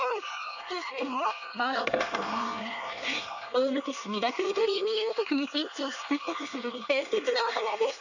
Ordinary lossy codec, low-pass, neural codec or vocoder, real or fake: none; 7.2 kHz; codec, 24 kHz, 1 kbps, SNAC; fake